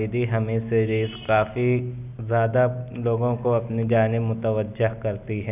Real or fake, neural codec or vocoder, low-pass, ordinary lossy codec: real; none; 3.6 kHz; none